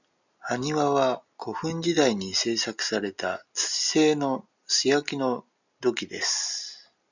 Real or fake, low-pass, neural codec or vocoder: real; 7.2 kHz; none